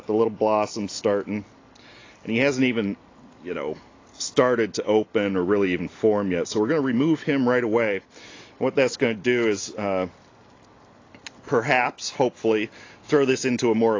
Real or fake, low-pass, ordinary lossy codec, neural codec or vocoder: real; 7.2 kHz; AAC, 32 kbps; none